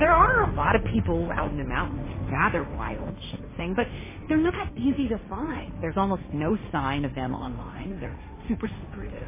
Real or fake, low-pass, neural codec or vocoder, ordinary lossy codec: fake; 3.6 kHz; codec, 16 kHz, 1.1 kbps, Voila-Tokenizer; MP3, 16 kbps